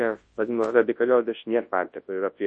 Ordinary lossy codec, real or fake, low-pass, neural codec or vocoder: MP3, 32 kbps; fake; 10.8 kHz; codec, 24 kHz, 0.9 kbps, WavTokenizer, large speech release